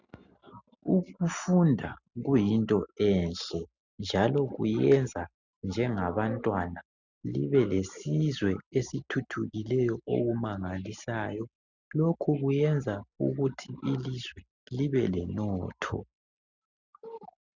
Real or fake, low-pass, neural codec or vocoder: real; 7.2 kHz; none